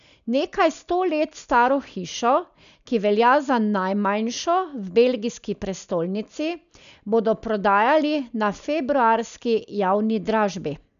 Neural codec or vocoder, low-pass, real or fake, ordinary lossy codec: none; 7.2 kHz; real; none